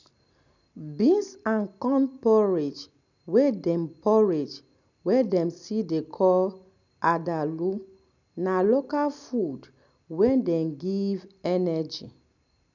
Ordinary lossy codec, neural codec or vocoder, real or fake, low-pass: none; none; real; 7.2 kHz